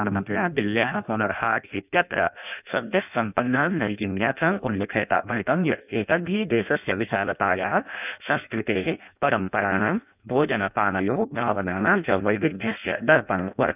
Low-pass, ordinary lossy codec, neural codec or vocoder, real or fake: 3.6 kHz; none; codec, 16 kHz in and 24 kHz out, 0.6 kbps, FireRedTTS-2 codec; fake